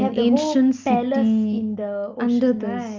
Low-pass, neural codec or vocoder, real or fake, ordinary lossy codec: 7.2 kHz; none; real; Opus, 24 kbps